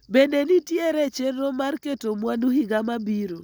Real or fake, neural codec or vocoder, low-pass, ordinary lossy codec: real; none; none; none